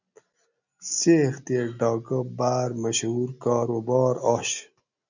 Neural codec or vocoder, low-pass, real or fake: none; 7.2 kHz; real